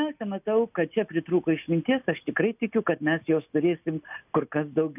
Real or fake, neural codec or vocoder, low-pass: real; none; 3.6 kHz